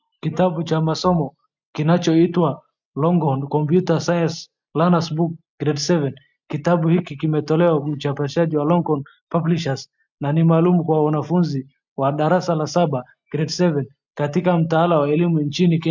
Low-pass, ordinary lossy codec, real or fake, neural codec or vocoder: 7.2 kHz; MP3, 64 kbps; real; none